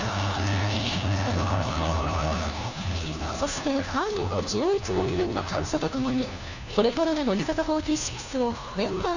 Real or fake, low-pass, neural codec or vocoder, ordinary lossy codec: fake; 7.2 kHz; codec, 16 kHz, 1 kbps, FunCodec, trained on LibriTTS, 50 frames a second; none